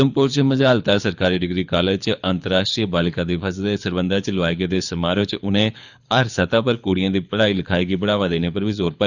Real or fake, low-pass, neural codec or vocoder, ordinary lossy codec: fake; 7.2 kHz; codec, 24 kHz, 6 kbps, HILCodec; none